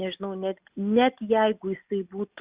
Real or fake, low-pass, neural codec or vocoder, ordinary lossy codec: real; 3.6 kHz; none; Opus, 64 kbps